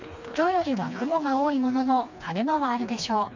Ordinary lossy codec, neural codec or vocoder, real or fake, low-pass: MP3, 64 kbps; codec, 16 kHz, 2 kbps, FreqCodec, smaller model; fake; 7.2 kHz